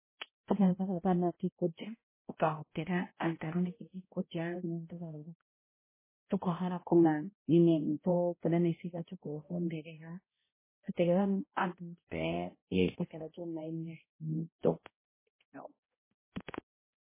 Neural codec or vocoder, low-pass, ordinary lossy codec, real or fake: codec, 16 kHz, 0.5 kbps, X-Codec, HuBERT features, trained on balanced general audio; 3.6 kHz; MP3, 16 kbps; fake